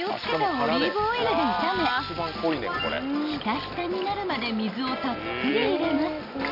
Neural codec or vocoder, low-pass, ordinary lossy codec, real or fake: none; 5.4 kHz; none; real